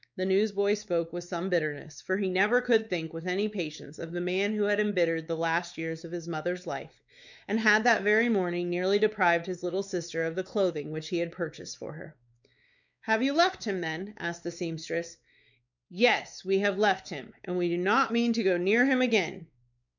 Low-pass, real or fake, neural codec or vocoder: 7.2 kHz; fake; codec, 16 kHz, 4 kbps, X-Codec, WavLM features, trained on Multilingual LibriSpeech